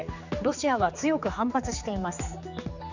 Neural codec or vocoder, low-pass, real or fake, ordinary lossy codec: codec, 16 kHz, 4 kbps, X-Codec, HuBERT features, trained on general audio; 7.2 kHz; fake; none